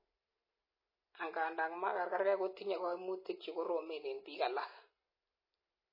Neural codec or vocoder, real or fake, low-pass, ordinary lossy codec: none; real; 5.4 kHz; MP3, 24 kbps